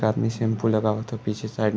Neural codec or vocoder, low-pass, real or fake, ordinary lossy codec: none; none; real; none